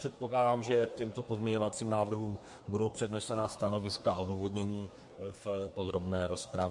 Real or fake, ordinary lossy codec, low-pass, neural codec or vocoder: fake; MP3, 48 kbps; 10.8 kHz; codec, 24 kHz, 1 kbps, SNAC